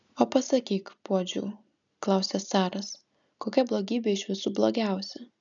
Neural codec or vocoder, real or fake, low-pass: none; real; 7.2 kHz